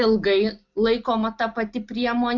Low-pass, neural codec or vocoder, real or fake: 7.2 kHz; none; real